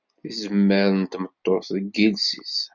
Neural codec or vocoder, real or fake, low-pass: none; real; 7.2 kHz